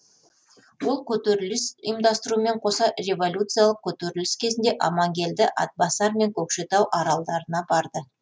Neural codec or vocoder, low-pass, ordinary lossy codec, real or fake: none; none; none; real